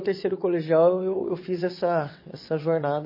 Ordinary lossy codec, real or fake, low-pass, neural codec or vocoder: MP3, 24 kbps; fake; 5.4 kHz; vocoder, 22.05 kHz, 80 mel bands, WaveNeXt